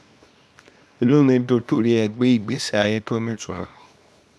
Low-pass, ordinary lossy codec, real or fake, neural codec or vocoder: none; none; fake; codec, 24 kHz, 0.9 kbps, WavTokenizer, small release